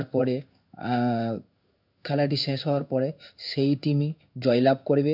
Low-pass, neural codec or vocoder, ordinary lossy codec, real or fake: 5.4 kHz; codec, 16 kHz in and 24 kHz out, 1 kbps, XY-Tokenizer; none; fake